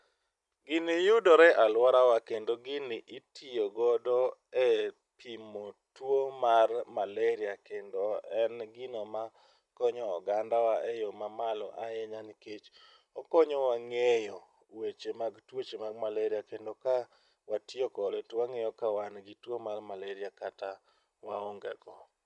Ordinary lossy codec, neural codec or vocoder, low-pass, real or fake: none; none; 10.8 kHz; real